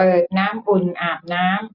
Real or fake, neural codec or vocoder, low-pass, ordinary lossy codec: real; none; 5.4 kHz; none